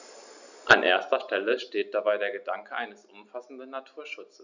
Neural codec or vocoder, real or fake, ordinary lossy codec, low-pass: none; real; none; 7.2 kHz